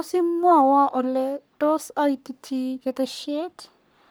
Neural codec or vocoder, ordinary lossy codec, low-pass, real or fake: codec, 44.1 kHz, 3.4 kbps, Pupu-Codec; none; none; fake